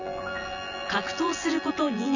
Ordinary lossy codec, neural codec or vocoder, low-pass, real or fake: none; vocoder, 24 kHz, 100 mel bands, Vocos; 7.2 kHz; fake